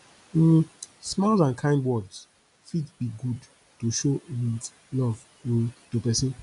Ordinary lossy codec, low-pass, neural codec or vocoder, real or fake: none; 10.8 kHz; none; real